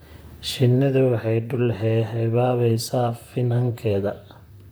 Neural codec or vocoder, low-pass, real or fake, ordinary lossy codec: vocoder, 44.1 kHz, 128 mel bands, Pupu-Vocoder; none; fake; none